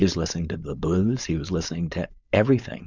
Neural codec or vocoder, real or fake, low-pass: codec, 16 kHz, 4.8 kbps, FACodec; fake; 7.2 kHz